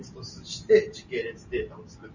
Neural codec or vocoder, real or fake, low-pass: none; real; 7.2 kHz